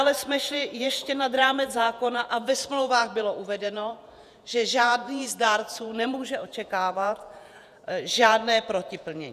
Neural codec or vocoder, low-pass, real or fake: vocoder, 48 kHz, 128 mel bands, Vocos; 14.4 kHz; fake